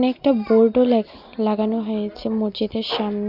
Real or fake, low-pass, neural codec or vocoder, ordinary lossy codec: real; 5.4 kHz; none; none